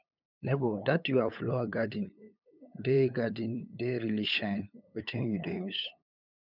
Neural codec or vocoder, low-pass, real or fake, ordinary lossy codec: codec, 16 kHz, 8 kbps, FunCodec, trained on LibriTTS, 25 frames a second; 5.4 kHz; fake; none